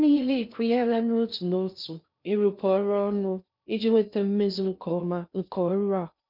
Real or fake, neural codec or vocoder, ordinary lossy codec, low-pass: fake; codec, 16 kHz in and 24 kHz out, 0.6 kbps, FocalCodec, streaming, 4096 codes; none; 5.4 kHz